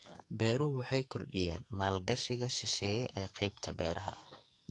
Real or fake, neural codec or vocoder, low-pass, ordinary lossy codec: fake; codec, 44.1 kHz, 2.6 kbps, SNAC; 10.8 kHz; AAC, 48 kbps